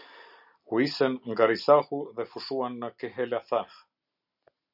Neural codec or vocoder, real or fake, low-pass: none; real; 5.4 kHz